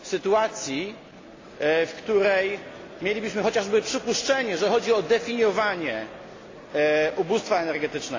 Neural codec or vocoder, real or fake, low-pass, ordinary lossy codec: none; real; 7.2 kHz; AAC, 32 kbps